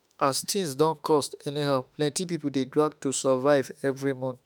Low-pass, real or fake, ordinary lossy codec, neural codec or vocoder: none; fake; none; autoencoder, 48 kHz, 32 numbers a frame, DAC-VAE, trained on Japanese speech